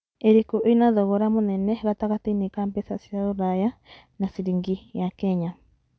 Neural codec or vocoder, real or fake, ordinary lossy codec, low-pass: none; real; none; none